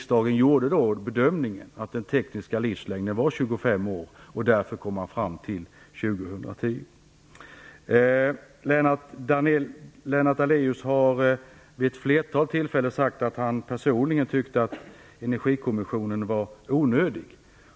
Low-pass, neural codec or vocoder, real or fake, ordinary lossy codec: none; none; real; none